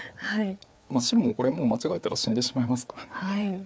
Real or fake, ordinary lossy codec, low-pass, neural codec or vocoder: fake; none; none; codec, 16 kHz, 4 kbps, FreqCodec, larger model